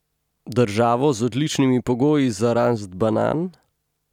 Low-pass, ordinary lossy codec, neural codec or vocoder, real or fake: 19.8 kHz; none; none; real